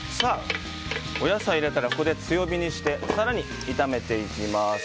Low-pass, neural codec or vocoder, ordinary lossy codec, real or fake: none; none; none; real